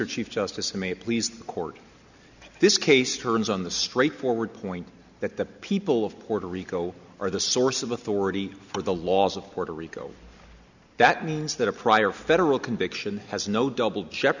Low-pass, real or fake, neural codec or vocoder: 7.2 kHz; real; none